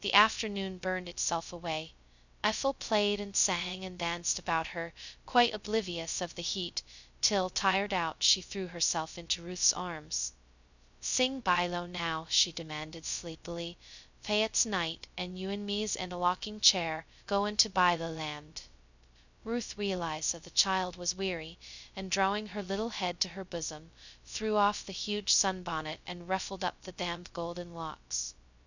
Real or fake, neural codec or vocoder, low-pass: fake; codec, 16 kHz, 0.2 kbps, FocalCodec; 7.2 kHz